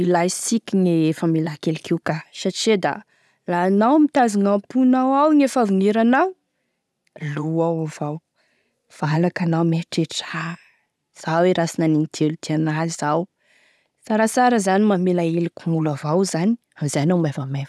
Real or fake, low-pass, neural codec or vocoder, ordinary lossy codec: real; none; none; none